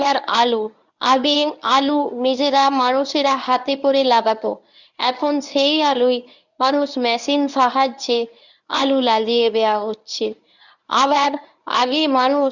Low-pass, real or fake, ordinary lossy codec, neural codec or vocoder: 7.2 kHz; fake; none; codec, 24 kHz, 0.9 kbps, WavTokenizer, medium speech release version 1